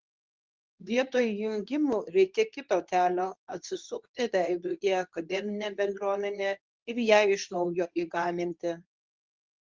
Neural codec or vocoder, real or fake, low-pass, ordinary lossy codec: codec, 24 kHz, 0.9 kbps, WavTokenizer, medium speech release version 2; fake; 7.2 kHz; Opus, 32 kbps